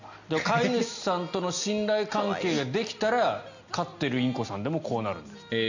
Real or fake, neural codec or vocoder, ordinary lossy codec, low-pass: real; none; none; 7.2 kHz